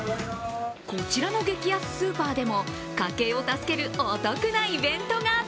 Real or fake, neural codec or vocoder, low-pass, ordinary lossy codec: real; none; none; none